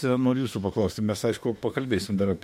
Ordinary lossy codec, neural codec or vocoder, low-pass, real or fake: MP3, 64 kbps; autoencoder, 48 kHz, 32 numbers a frame, DAC-VAE, trained on Japanese speech; 19.8 kHz; fake